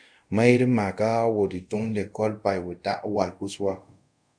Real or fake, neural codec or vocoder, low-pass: fake; codec, 24 kHz, 0.5 kbps, DualCodec; 9.9 kHz